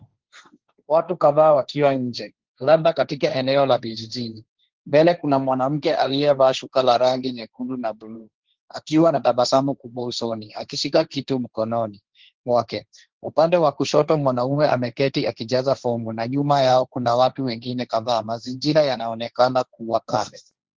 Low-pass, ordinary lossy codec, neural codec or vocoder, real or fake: 7.2 kHz; Opus, 16 kbps; codec, 16 kHz, 1.1 kbps, Voila-Tokenizer; fake